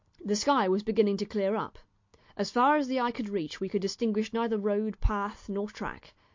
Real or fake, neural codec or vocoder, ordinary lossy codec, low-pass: real; none; MP3, 48 kbps; 7.2 kHz